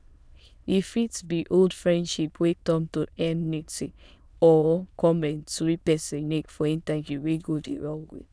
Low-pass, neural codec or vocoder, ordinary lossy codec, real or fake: none; autoencoder, 22.05 kHz, a latent of 192 numbers a frame, VITS, trained on many speakers; none; fake